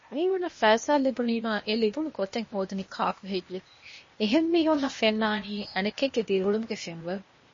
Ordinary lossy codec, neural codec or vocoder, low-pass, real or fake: MP3, 32 kbps; codec, 16 kHz, 0.8 kbps, ZipCodec; 7.2 kHz; fake